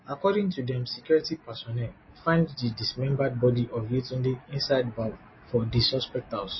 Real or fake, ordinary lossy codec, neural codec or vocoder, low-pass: real; MP3, 24 kbps; none; 7.2 kHz